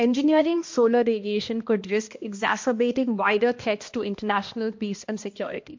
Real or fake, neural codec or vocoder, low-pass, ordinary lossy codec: fake; codec, 16 kHz, 1 kbps, X-Codec, HuBERT features, trained on balanced general audio; 7.2 kHz; MP3, 48 kbps